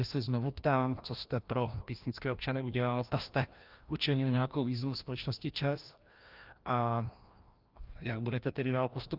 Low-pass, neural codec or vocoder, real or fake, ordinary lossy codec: 5.4 kHz; codec, 16 kHz, 1 kbps, FreqCodec, larger model; fake; Opus, 24 kbps